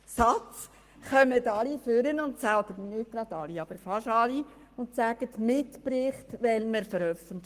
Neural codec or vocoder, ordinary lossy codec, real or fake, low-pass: codec, 44.1 kHz, 7.8 kbps, Pupu-Codec; Opus, 24 kbps; fake; 14.4 kHz